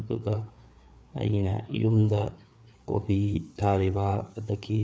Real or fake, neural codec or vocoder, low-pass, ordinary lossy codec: fake; codec, 16 kHz, 4 kbps, FreqCodec, larger model; none; none